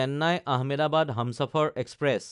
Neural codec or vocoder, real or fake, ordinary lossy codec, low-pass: none; real; none; 10.8 kHz